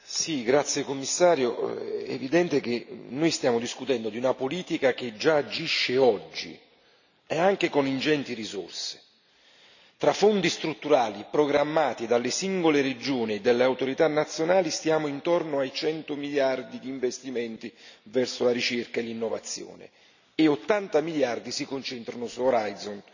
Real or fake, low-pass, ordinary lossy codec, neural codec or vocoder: real; 7.2 kHz; none; none